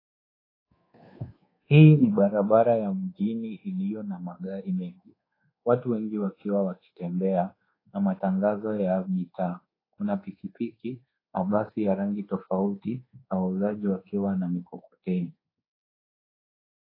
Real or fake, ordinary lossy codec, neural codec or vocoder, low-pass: fake; AAC, 24 kbps; codec, 24 kHz, 1.2 kbps, DualCodec; 5.4 kHz